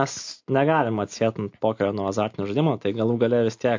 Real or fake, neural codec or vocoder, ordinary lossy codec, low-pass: real; none; MP3, 48 kbps; 7.2 kHz